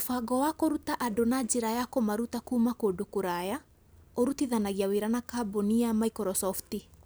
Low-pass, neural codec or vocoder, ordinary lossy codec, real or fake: none; none; none; real